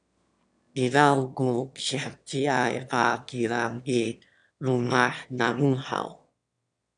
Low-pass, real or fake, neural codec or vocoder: 9.9 kHz; fake; autoencoder, 22.05 kHz, a latent of 192 numbers a frame, VITS, trained on one speaker